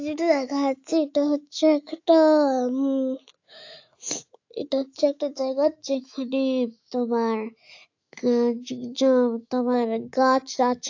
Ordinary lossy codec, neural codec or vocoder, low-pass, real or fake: AAC, 48 kbps; autoencoder, 48 kHz, 128 numbers a frame, DAC-VAE, trained on Japanese speech; 7.2 kHz; fake